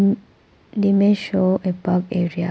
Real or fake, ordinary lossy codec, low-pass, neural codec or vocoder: real; none; none; none